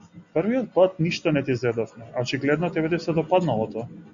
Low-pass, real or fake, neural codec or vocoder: 7.2 kHz; real; none